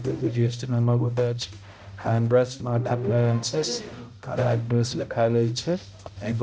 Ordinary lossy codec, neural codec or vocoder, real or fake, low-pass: none; codec, 16 kHz, 0.5 kbps, X-Codec, HuBERT features, trained on balanced general audio; fake; none